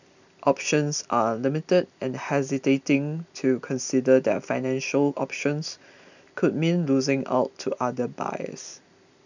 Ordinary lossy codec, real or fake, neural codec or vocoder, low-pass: none; real; none; 7.2 kHz